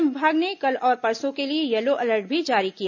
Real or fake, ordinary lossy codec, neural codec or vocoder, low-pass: real; none; none; 7.2 kHz